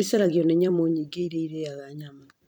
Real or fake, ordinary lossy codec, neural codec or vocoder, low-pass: fake; none; vocoder, 44.1 kHz, 128 mel bands every 256 samples, BigVGAN v2; 19.8 kHz